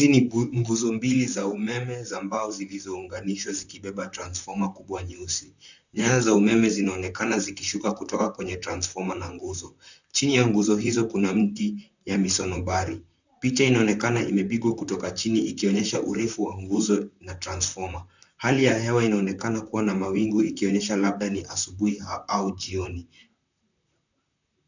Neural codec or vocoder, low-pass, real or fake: vocoder, 44.1 kHz, 128 mel bands, Pupu-Vocoder; 7.2 kHz; fake